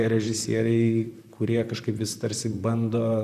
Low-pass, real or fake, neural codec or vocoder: 14.4 kHz; fake; vocoder, 44.1 kHz, 128 mel bands, Pupu-Vocoder